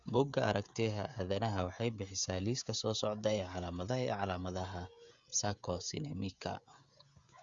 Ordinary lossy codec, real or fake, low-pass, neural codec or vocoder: Opus, 64 kbps; fake; 7.2 kHz; codec, 16 kHz, 16 kbps, FreqCodec, smaller model